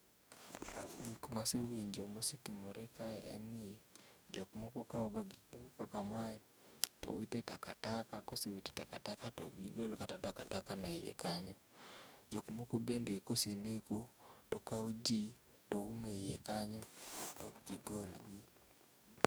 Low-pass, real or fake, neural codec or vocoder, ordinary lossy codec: none; fake; codec, 44.1 kHz, 2.6 kbps, DAC; none